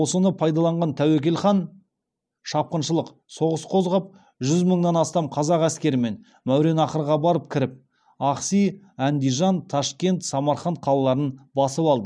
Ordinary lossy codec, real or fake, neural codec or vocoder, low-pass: none; real; none; none